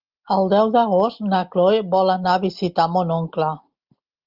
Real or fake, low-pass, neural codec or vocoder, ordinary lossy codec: real; 5.4 kHz; none; Opus, 24 kbps